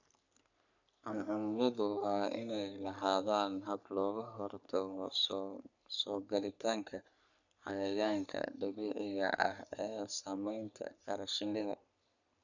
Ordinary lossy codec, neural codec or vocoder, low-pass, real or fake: none; codec, 44.1 kHz, 3.4 kbps, Pupu-Codec; 7.2 kHz; fake